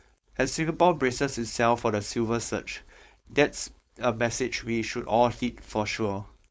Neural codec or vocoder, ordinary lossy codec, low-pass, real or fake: codec, 16 kHz, 4.8 kbps, FACodec; none; none; fake